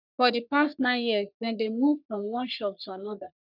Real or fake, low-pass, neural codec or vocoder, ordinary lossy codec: fake; 5.4 kHz; codec, 44.1 kHz, 3.4 kbps, Pupu-Codec; none